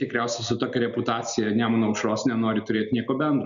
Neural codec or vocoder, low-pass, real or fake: none; 7.2 kHz; real